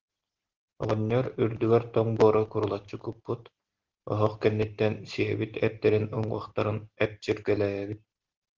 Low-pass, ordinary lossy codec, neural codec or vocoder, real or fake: 7.2 kHz; Opus, 16 kbps; none; real